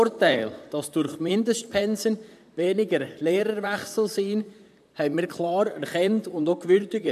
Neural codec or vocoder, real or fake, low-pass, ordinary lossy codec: vocoder, 44.1 kHz, 128 mel bands, Pupu-Vocoder; fake; 14.4 kHz; AAC, 96 kbps